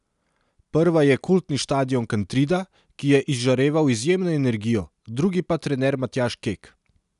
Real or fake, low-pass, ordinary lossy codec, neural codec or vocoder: real; 10.8 kHz; none; none